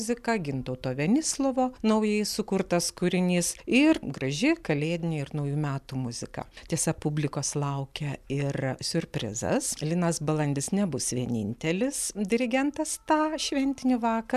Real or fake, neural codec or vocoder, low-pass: real; none; 14.4 kHz